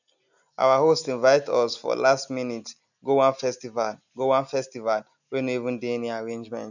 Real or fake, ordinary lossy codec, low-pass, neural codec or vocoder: real; none; 7.2 kHz; none